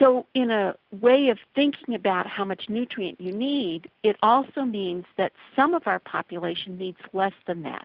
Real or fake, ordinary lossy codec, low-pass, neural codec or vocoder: real; Opus, 64 kbps; 5.4 kHz; none